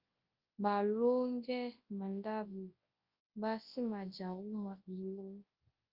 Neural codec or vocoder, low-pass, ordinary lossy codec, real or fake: codec, 24 kHz, 0.9 kbps, WavTokenizer, large speech release; 5.4 kHz; Opus, 32 kbps; fake